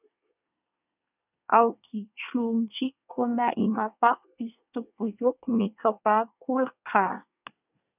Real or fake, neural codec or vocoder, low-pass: fake; codec, 24 kHz, 1 kbps, SNAC; 3.6 kHz